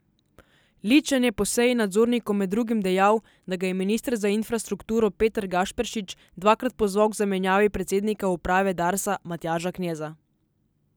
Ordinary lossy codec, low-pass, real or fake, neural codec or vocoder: none; none; real; none